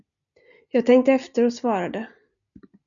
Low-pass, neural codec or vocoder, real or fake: 7.2 kHz; none; real